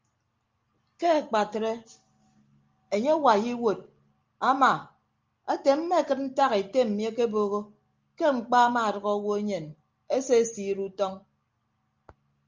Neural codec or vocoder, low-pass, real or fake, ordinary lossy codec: none; 7.2 kHz; real; Opus, 32 kbps